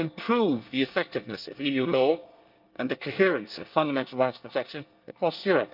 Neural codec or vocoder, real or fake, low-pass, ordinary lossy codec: codec, 24 kHz, 1 kbps, SNAC; fake; 5.4 kHz; Opus, 24 kbps